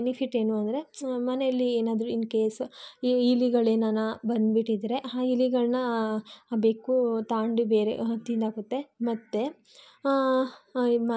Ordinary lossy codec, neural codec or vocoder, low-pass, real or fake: none; none; none; real